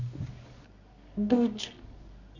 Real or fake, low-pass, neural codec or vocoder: fake; 7.2 kHz; codec, 24 kHz, 0.9 kbps, WavTokenizer, medium music audio release